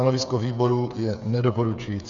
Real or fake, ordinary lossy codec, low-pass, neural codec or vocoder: fake; MP3, 64 kbps; 7.2 kHz; codec, 16 kHz, 8 kbps, FreqCodec, smaller model